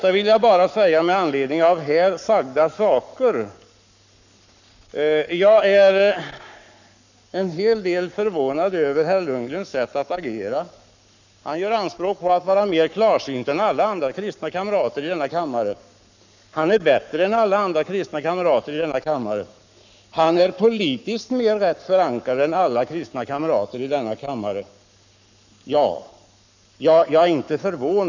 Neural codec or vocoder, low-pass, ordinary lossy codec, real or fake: codec, 44.1 kHz, 7.8 kbps, Pupu-Codec; 7.2 kHz; none; fake